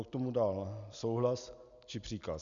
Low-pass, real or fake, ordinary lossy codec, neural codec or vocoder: 7.2 kHz; real; MP3, 96 kbps; none